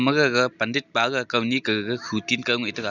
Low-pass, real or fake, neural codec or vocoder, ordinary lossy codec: 7.2 kHz; real; none; none